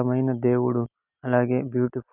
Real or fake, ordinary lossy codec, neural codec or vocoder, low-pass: real; none; none; 3.6 kHz